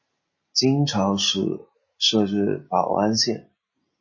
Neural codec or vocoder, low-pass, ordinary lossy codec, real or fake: none; 7.2 kHz; MP3, 32 kbps; real